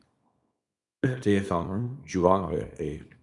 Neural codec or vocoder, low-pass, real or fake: codec, 24 kHz, 0.9 kbps, WavTokenizer, small release; 10.8 kHz; fake